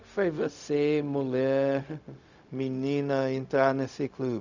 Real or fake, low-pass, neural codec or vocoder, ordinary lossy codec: fake; 7.2 kHz; codec, 16 kHz, 0.4 kbps, LongCat-Audio-Codec; none